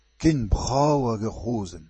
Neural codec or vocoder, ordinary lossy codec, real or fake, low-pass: vocoder, 24 kHz, 100 mel bands, Vocos; MP3, 32 kbps; fake; 10.8 kHz